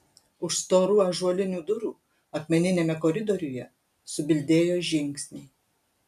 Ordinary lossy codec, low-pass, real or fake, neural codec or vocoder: MP3, 96 kbps; 14.4 kHz; real; none